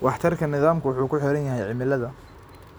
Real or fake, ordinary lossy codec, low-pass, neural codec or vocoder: real; none; none; none